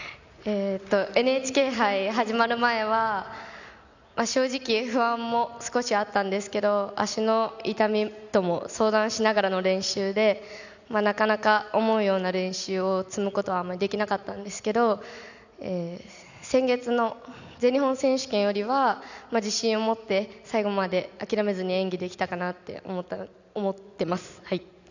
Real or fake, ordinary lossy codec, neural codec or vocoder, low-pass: real; none; none; 7.2 kHz